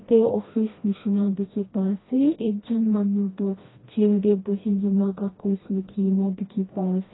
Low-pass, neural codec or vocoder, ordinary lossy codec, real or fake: 7.2 kHz; codec, 16 kHz, 1 kbps, FreqCodec, smaller model; AAC, 16 kbps; fake